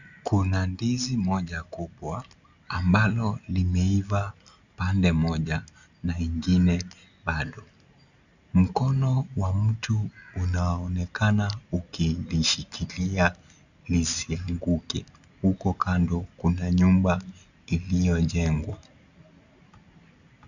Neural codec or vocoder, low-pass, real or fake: none; 7.2 kHz; real